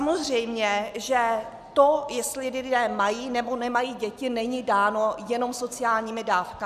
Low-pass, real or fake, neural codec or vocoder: 14.4 kHz; real; none